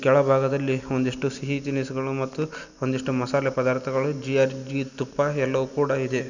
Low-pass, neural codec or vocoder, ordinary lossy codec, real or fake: 7.2 kHz; none; none; real